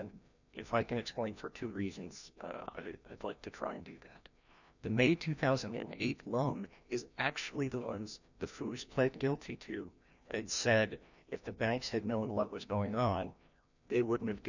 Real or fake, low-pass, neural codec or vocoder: fake; 7.2 kHz; codec, 16 kHz, 1 kbps, FreqCodec, larger model